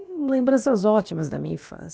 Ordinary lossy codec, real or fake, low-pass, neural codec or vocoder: none; fake; none; codec, 16 kHz, about 1 kbps, DyCAST, with the encoder's durations